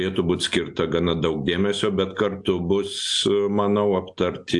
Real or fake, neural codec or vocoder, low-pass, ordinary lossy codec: real; none; 10.8 kHz; AAC, 64 kbps